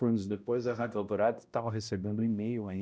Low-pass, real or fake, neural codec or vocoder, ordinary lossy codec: none; fake; codec, 16 kHz, 0.5 kbps, X-Codec, HuBERT features, trained on balanced general audio; none